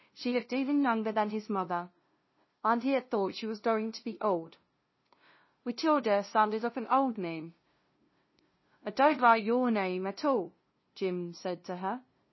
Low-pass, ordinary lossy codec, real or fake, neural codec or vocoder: 7.2 kHz; MP3, 24 kbps; fake; codec, 16 kHz, 0.5 kbps, FunCodec, trained on LibriTTS, 25 frames a second